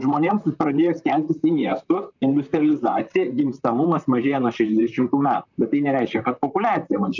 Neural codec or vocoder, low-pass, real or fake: vocoder, 44.1 kHz, 128 mel bands, Pupu-Vocoder; 7.2 kHz; fake